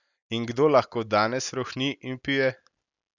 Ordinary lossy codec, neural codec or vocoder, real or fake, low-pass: none; none; real; 7.2 kHz